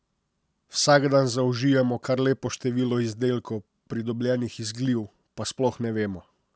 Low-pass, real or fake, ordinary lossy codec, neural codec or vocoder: none; real; none; none